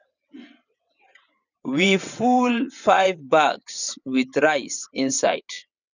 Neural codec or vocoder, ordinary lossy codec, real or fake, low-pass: vocoder, 22.05 kHz, 80 mel bands, WaveNeXt; none; fake; 7.2 kHz